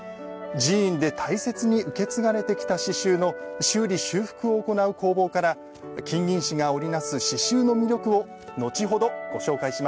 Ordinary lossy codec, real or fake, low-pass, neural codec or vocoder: none; real; none; none